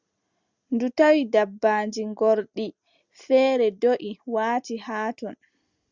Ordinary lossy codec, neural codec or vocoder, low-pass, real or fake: Opus, 64 kbps; none; 7.2 kHz; real